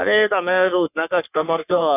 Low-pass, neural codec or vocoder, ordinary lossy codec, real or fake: 3.6 kHz; codec, 44.1 kHz, 3.4 kbps, Pupu-Codec; none; fake